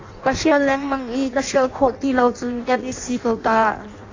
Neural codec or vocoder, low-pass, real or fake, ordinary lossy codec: codec, 16 kHz in and 24 kHz out, 0.6 kbps, FireRedTTS-2 codec; 7.2 kHz; fake; AAC, 32 kbps